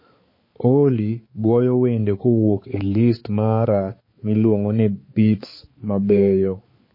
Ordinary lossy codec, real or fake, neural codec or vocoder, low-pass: MP3, 24 kbps; fake; codec, 16 kHz, 4 kbps, X-Codec, HuBERT features, trained on balanced general audio; 5.4 kHz